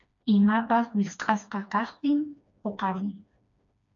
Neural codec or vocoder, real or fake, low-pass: codec, 16 kHz, 2 kbps, FreqCodec, smaller model; fake; 7.2 kHz